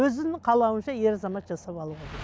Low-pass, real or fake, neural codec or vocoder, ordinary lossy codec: none; real; none; none